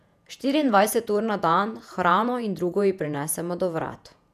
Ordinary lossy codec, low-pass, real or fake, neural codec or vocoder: none; 14.4 kHz; fake; vocoder, 48 kHz, 128 mel bands, Vocos